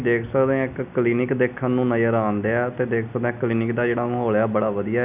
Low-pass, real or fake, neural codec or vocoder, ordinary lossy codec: 3.6 kHz; real; none; none